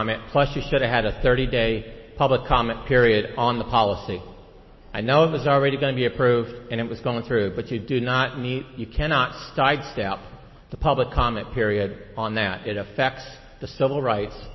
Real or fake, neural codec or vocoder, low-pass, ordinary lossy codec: real; none; 7.2 kHz; MP3, 24 kbps